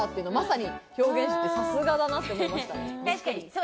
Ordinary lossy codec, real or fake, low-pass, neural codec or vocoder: none; real; none; none